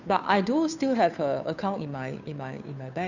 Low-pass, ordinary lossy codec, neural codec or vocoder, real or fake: 7.2 kHz; none; codec, 16 kHz, 2 kbps, FunCodec, trained on Chinese and English, 25 frames a second; fake